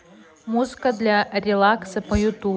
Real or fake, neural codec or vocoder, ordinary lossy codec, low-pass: real; none; none; none